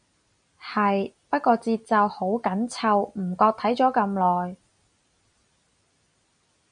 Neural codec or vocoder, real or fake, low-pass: none; real; 9.9 kHz